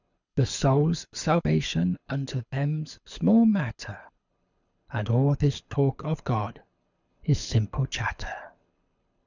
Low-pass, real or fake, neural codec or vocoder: 7.2 kHz; fake; codec, 24 kHz, 6 kbps, HILCodec